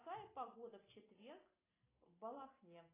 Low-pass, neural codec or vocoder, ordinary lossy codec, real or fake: 3.6 kHz; none; AAC, 16 kbps; real